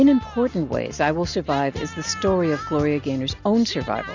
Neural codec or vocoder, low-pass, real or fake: none; 7.2 kHz; real